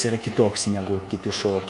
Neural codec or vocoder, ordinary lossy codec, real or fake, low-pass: codec, 24 kHz, 1.2 kbps, DualCodec; AAC, 64 kbps; fake; 10.8 kHz